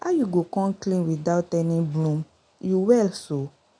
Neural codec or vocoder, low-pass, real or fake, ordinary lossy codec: none; 9.9 kHz; real; none